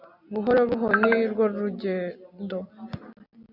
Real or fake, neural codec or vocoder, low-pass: real; none; 5.4 kHz